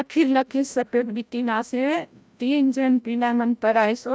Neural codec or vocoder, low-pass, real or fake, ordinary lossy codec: codec, 16 kHz, 0.5 kbps, FreqCodec, larger model; none; fake; none